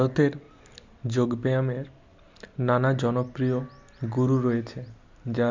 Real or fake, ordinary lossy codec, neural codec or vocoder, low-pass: real; MP3, 64 kbps; none; 7.2 kHz